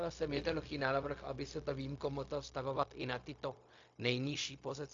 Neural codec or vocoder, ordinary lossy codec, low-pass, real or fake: codec, 16 kHz, 0.4 kbps, LongCat-Audio-Codec; AAC, 48 kbps; 7.2 kHz; fake